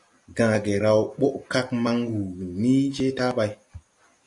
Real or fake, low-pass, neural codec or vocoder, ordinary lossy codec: real; 10.8 kHz; none; MP3, 96 kbps